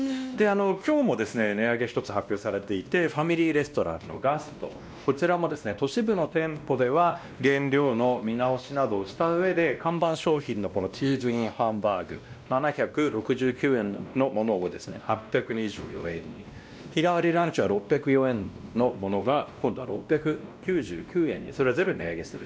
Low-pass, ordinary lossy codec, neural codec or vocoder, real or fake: none; none; codec, 16 kHz, 1 kbps, X-Codec, WavLM features, trained on Multilingual LibriSpeech; fake